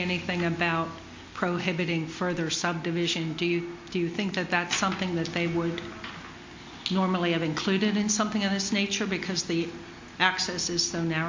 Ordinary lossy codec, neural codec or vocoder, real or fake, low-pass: MP3, 48 kbps; none; real; 7.2 kHz